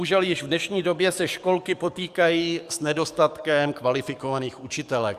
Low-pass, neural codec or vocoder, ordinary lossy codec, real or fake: 14.4 kHz; codec, 44.1 kHz, 7.8 kbps, DAC; Opus, 64 kbps; fake